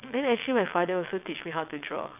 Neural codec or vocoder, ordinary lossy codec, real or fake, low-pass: vocoder, 22.05 kHz, 80 mel bands, WaveNeXt; none; fake; 3.6 kHz